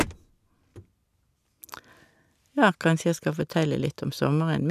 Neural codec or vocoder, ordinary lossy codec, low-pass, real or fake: none; none; 14.4 kHz; real